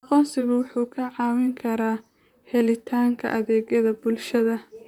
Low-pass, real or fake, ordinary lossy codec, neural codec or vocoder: 19.8 kHz; fake; none; vocoder, 44.1 kHz, 128 mel bands, Pupu-Vocoder